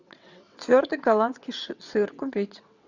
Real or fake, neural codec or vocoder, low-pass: fake; vocoder, 22.05 kHz, 80 mel bands, WaveNeXt; 7.2 kHz